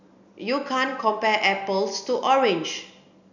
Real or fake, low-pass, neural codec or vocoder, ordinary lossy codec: real; 7.2 kHz; none; none